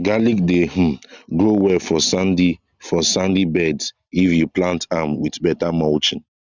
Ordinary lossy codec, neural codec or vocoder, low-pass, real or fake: Opus, 64 kbps; none; 7.2 kHz; real